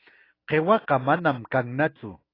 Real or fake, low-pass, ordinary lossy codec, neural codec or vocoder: real; 5.4 kHz; AAC, 24 kbps; none